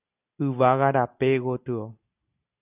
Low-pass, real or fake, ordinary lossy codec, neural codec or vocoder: 3.6 kHz; real; AAC, 32 kbps; none